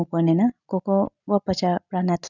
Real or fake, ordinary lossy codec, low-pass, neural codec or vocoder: real; none; 7.2 kHz; none